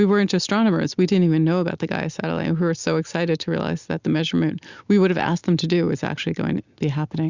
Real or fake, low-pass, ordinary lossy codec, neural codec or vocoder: real; 7.2 kHz; Opus, 64 kbps; none